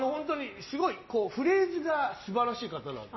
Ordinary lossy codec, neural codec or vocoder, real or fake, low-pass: MP3, 24 kbps; none; real; 7.2 kHz